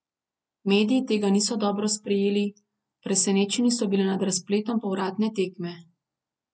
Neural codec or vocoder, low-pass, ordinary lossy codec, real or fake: none; none; none; real